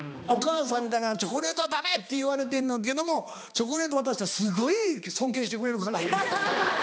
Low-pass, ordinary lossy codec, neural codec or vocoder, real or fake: none; none; codec, 16 kHz, 2 kbps, X-Codec, HuBERT features, trained on balanced general audio; fake